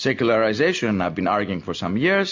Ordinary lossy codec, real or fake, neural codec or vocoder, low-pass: MP3, 48 kbps; real; none; 7.2 kHz